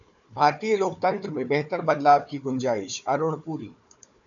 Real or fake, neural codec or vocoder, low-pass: fake; codec, 16 kHz, 4 kbps, FunCodec, trained on Chinese and English, 50 frames a second; 7.2 kHz